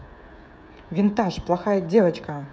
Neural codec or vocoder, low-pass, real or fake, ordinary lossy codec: codec, 16 kHz, 16 kbps, FreqCodec, smaller model; none; fake; none